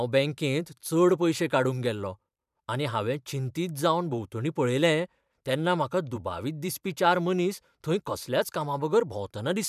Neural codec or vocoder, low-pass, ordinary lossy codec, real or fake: vocoder, 44.1 kHz, 128 mel bands every 512 samples, BigVGAN v2; 14.4 kHz; none; fake